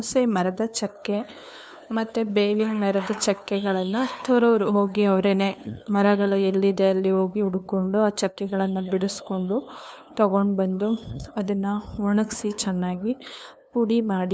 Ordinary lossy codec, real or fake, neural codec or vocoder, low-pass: none; fake; codec, 16 kHz, 2 kbps, FunCodec, trained on LibriTTS, 25 frames a second; none